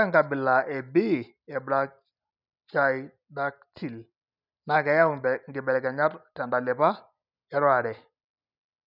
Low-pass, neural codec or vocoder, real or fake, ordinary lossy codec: 5.4 kHz; none; real; none